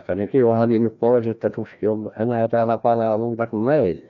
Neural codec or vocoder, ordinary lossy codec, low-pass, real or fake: codec, 16 kHz, 1 kbps, FreqCodec, larger model; none; 7.2 kHz; fake